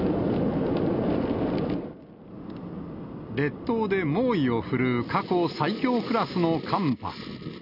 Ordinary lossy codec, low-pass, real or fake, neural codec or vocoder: none; 5.4 kHz; real; none